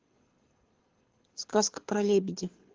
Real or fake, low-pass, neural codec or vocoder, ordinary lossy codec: fake; 7.2 kHz; codec, 24 kHz, 6 kbps, HILCodec; Opus, 16 kbps